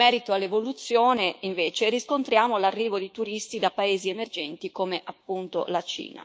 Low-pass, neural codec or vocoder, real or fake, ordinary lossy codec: none; codec, 16 kHz, 6 kbps, DAC; fake; none